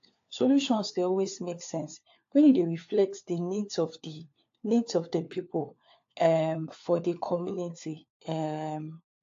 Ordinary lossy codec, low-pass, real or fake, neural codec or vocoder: AAC, 48 kbps; 7.2 kHz; fake; codec, 16 kHz, 4 kbps, FunCodec, trained on LibriTTS, 50 frames a second